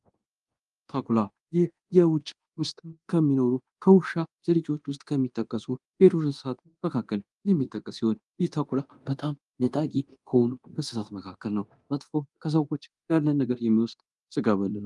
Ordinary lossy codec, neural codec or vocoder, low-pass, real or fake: Opus, 32 kbps; codec, 24 kHz, 0.9 kbps, DualCodec; 10.8 kHz; fake